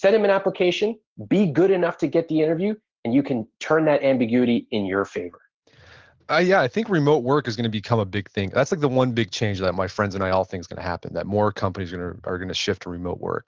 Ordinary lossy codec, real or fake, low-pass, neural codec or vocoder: Opus, 16 kbps; real; 7.2 kHz; none